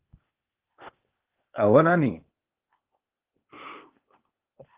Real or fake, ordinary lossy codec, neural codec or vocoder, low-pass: fake; Opus, 16 kbps; codec, 16 kHz, 0.8 kbps, ZipCodec; 3.6 kHz